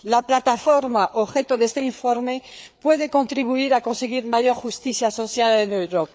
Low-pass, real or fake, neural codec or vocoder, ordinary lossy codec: none; fake; codec, 16 kHz, 4 kbps, FreqCodec, larger model; none